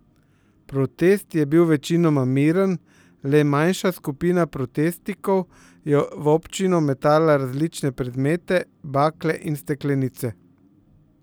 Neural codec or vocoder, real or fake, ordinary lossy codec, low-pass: none; real; none; none